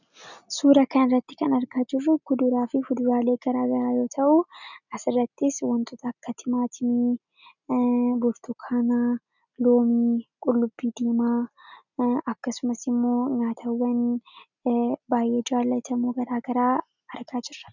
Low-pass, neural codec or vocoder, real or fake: 7.2 kHz; none; real